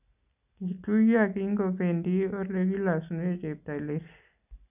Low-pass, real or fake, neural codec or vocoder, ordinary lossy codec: 3.6 kHz; real; none; none